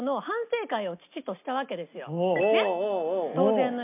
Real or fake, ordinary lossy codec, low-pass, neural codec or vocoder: real; MP3, 32 kbps; 3.6 kHz; none